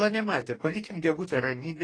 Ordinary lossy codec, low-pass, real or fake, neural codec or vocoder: AAC, 32 kbps; 9.9 kHz; fake; codec, 44.1 kHz, 2.6 kbps, DAC